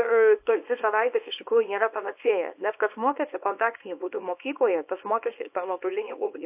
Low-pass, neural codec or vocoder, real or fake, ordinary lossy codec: 3.6 kHz; codec, 24 kHz, 0.9 kbps, WavTokenizer, small release; fake; AAC, 32 kbps